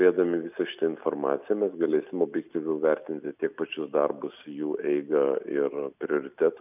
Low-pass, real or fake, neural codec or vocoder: 3.6 kHz; real; none